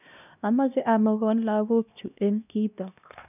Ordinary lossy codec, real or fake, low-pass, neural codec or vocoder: none; fake; 3.6 kHz; codec, 16 kHz, 1 kbps, X-Codec, HuBERT features, trained on LibriSpeech